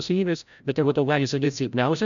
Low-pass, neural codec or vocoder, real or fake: 7.2 kHz; codec, 16 kHz, 0.5 kbps, FreqCodec, larger model; fake